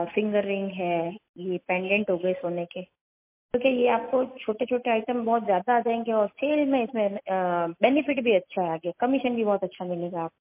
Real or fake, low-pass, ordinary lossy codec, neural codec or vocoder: real; 3.6 kHz; MP3, 24 kbps; none